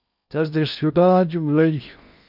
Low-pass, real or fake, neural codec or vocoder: 5.4 kHz; fake; codec, 16 kHz in and 24 kHz out, 0.6 kbps, FocalCodec, streaming, 4096 codes